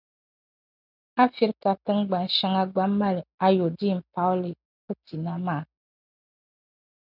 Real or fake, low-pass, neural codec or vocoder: real; 5.4 kHz; none